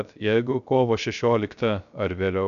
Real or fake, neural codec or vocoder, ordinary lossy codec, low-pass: fake; codec, 16 kHz, 0.3 kbps, FocalCodec; AAC, 96 kbps; 7.2 kHz